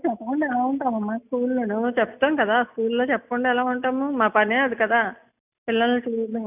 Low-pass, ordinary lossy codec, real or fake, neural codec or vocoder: 3.6 kHz; none; real; none